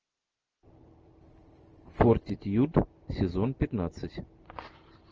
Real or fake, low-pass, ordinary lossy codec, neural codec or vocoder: real; 7.2 kHz; Opus, 24 kbps; none